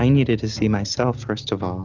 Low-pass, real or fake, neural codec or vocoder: 7.2 kHz; real; none